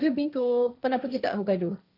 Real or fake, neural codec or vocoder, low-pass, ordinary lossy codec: fake; codec, 16 kHz, 1.1 kbps, Voila-Tokenizer; 5.4 kHz; none